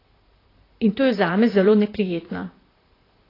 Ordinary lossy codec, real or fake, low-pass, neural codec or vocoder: AAC, 24 kbps; fake; 5.4 kHz; vocoder, 44.1 kHz, 128 mel bands, Pupu-Vocoder